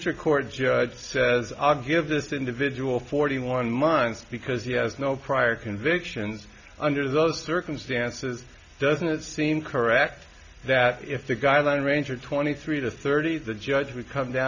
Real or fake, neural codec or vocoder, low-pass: real; none; 7.2 kHz